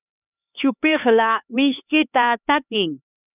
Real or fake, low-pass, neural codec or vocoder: fake; 3.6 kHz; codec, 16 kHz, 2 kbps, X-Codec, HuBERT features, trained on LibriSpeech